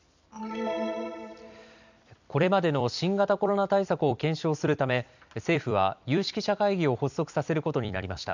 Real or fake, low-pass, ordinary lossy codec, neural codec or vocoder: fake; 7.2 kHz; none; vocoder, 44.1 kHz, 128 mel bands every 256 samples, BigVGAN v2